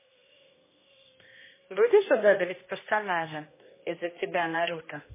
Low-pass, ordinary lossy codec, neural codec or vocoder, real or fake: 3.6 kHz; MP3, 16 kbps; codec, 16 kHz, 1 kbps, X-Codec, HuBERT features, trained on general audio; fake